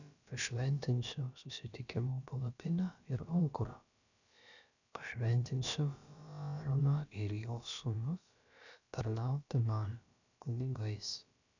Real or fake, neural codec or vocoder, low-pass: fake; codec, 16 kHz, about 1 kbps, DyCAST, with the encoder's durations; 7.2 kHz